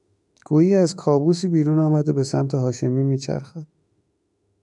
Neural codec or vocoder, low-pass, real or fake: autoencoder, 48 kHz, 32 numbers a frame, DAC-VAE, trained on Japanese speech; 10.8 kHz; fake